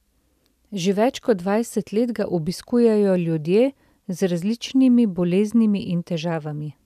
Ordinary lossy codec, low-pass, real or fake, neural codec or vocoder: none; 14.4 kHz; real; none